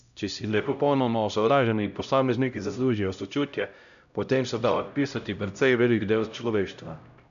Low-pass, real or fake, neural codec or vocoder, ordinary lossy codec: 7.2 kHz; fake; codec, 16 kHz, 0.5 kbps, X-Codec, HuBERT features, trained on LibriSpeech; none